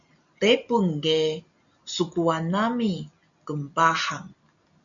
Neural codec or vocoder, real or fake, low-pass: none; real; 7.2 kHz